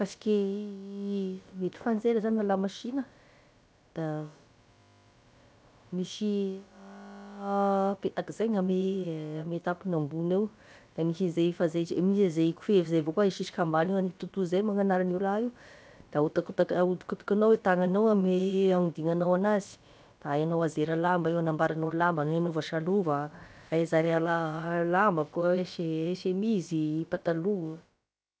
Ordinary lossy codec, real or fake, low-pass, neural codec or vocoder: none; fake; none; codec, 16 kHz, about 1 kbps, DyCAST, with the encoder's durations